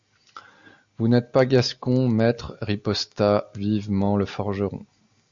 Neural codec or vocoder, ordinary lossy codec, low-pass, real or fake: none; Opus, 64 kbps; 7.2 kHz; real